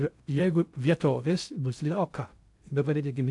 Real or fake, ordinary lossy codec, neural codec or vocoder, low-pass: fake; AAC, 64 kbps; codec, 16 kHz in and 24 kHz out, 0.6 kbps, FocalCodec, streaming, 2048 codes; 10.8 kHz